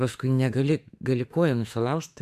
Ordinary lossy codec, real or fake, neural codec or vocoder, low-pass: AAC, 96 kbps; fake; autoencoder, 48 kHz, 32 numbers a frame, DAC-VAE, trained on Japanese speech; 14.4 kHz